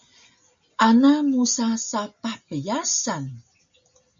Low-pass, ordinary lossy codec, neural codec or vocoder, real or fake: 7.2 kHz; MP3, 64 kbps; none; real